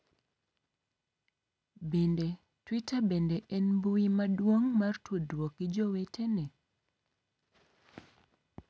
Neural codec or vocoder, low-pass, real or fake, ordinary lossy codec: none; none; real; none